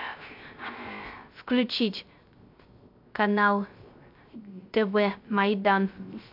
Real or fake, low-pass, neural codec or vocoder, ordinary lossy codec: fake; 5.4 kHz; codec, 16 kHz, 0.3 kbps, FocalCodec; MP3, 48 kbps